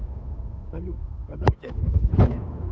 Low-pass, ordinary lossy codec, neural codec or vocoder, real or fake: none; none; codec, 16 kHz, 8 kbps, FunCodec, trained on Chinese and English, 25 frames a second; fake